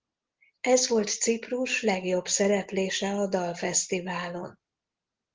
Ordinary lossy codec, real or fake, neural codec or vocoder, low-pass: Opus, 16 kbps; real; none; 7.2 kHz